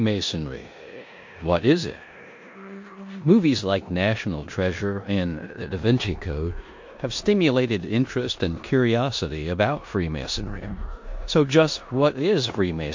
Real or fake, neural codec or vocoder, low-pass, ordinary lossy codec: fake; codec, 16 kHz in and 24 kHz out, 0.9 kbps, LongCat-Audio-Codec, four codebook decoder; 7.2 kHz; MP3, 48 kbps